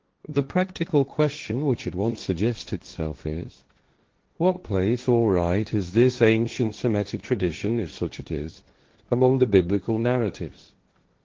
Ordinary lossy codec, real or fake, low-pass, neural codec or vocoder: Opus, 16 kbps; fake; 7.2 kHz; codec, 16 kHz, 1.1 kbps, Voila-Tokenizer